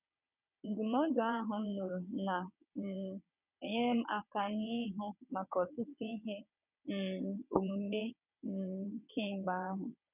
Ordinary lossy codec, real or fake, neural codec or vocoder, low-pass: none; fake; vocoder, 22.05 kHz, 80 mel bands, WaveNeXt; 3.6 kHz